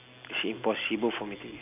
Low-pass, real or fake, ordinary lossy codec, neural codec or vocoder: 3.6 kHz; real; none; none